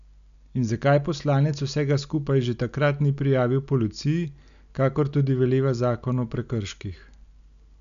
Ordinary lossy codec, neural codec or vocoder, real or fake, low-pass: none; none; real; 7.2 kHz